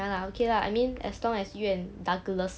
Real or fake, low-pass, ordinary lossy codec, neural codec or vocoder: real; none; none; none